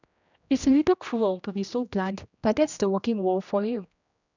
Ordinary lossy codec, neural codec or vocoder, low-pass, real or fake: none; codec, 16 kHz, 1 kbps, X-Codec, HuBERT features, trained on general audio; 7.2 kHz; fake